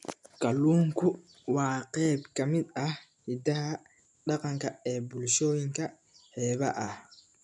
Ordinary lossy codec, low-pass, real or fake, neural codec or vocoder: none; 10.8 kHz; real; none